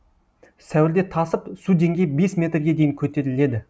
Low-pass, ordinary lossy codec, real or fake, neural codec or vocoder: none; none; real; none